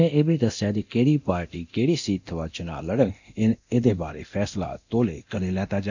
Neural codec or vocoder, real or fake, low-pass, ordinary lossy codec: codec, 24 kHz, 0.9 kbps, DualCodec; fake; 7.2 kHz; none